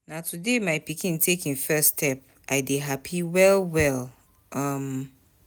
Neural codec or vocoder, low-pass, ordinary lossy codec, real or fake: none; none; none; real